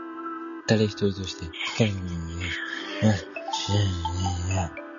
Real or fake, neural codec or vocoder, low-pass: real; none; 7.2 kHz